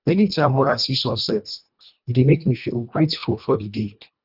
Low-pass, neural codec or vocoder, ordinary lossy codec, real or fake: 5.4 kHz; codec, 24 kHz, 1.5 kbps, HILCodec; none; fake